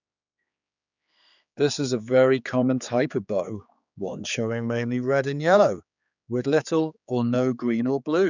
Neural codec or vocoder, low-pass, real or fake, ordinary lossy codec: codec, 16 kHz, 4 kbps, X-Codec, HuBERT features, trained on general audio; 7.2 kHz; fake; none